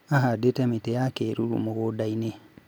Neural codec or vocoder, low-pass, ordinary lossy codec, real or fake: none; none; none; real